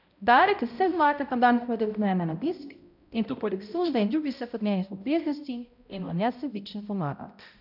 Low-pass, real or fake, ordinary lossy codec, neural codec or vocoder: 5.4 kHz; fake; none; codec, 16 kHz, 0.5 kbps, X-Codec, HuBERT features, trained on balanced general audio